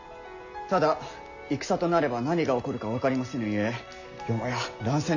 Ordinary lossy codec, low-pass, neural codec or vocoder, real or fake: none; 7.2 kHz; none; real